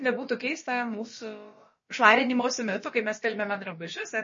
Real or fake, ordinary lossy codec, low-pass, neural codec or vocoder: fake; MP3, 32 kbps; 7.2 kHz; codec, 16 kHz, about 1 kbps, DyCAST, with the encoder's durations